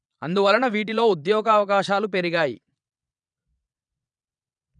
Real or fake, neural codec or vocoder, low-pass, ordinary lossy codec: fake; vocoder, 22.05 kHz, 80 mel bands, Vocos; 9.9 kHz; none